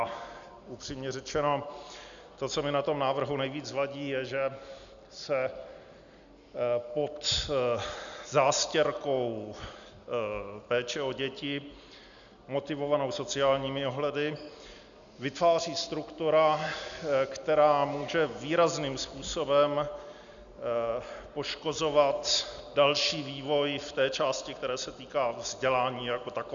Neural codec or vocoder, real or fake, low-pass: none; real; 7.2 kHz